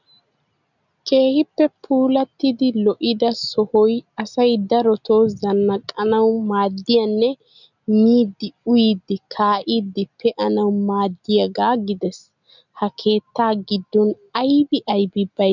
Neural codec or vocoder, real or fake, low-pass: none; real; 7.2 kHz